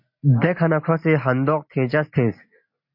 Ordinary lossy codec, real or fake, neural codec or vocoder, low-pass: MP3, 32 kbps; real; none; 5.4 kHz